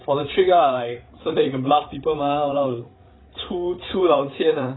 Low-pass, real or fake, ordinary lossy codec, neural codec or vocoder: 7.2 kHz; fake; AAC, 16 kbps; codec, 16 kHz, 8 kbps, FreqCodec, larger model